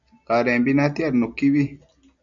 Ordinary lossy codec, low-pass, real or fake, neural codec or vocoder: MP3, 64 kbps; 7.2 kHz; real; none